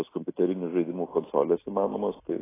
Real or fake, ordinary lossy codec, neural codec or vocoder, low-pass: real; AAC, 16 kbps; none; 3.6 kHz